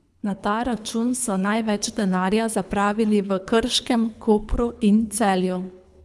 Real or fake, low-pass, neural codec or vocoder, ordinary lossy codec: fake; none; codec, 24 kHz, 3 kbps, HILCodec; none